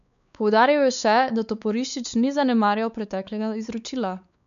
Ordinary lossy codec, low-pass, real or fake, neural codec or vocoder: none; 7.2 kHz; fake; codec, 16 kHz, 4 kbps, X-Codec, WavLM features, trained on Multilingual LibriSpeech